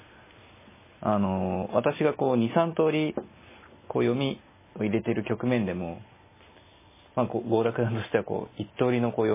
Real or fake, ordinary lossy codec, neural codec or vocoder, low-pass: real; MP3, 16 kbps; none; 3.6 kHz